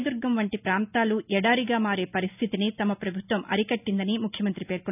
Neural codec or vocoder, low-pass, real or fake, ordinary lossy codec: none; 3.6 kHz; real; none